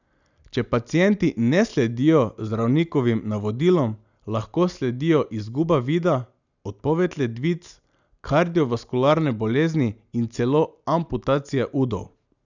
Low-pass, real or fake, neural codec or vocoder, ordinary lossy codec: 7.2 kHz; real; none; none